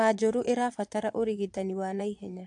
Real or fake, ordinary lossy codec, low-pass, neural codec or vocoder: fake; AAC, 64 kbps; 9.9 kHz; vocoder, 22.05 kHz, 80 mel bands, Vocos